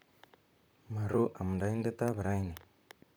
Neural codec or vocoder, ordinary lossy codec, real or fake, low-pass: vocoder, 44.1 kHz, 128 mel bands, Pupu-Vocoder; none; fake; none